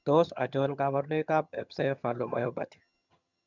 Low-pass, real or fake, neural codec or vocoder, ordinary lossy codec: 7.2 kHz; fake; vocoder, 22.05 kHz, 80 mel bands, HiFi-GAN; none